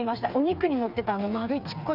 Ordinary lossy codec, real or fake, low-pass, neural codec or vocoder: none; fake; 5.4 kHz; codec, 16 kHz, 4 kbps, FreqCodec, smaller model